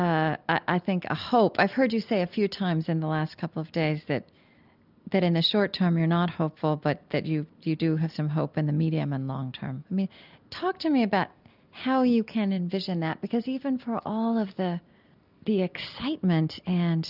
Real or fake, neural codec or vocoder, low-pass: real; none; 5.4 kHz